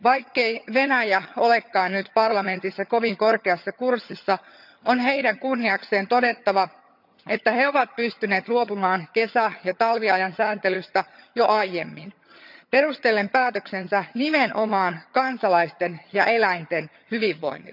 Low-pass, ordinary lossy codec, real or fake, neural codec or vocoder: 5.4 kHz; none; fake; vocoder, 22.05 kHz, 80 mel bands, HiFi-GAN